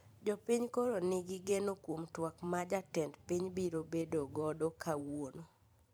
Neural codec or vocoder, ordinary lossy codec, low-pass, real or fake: vocoder, 44.1 kHz, 128 mel bands every 512 samples, BigVGAN v2; none; none; fake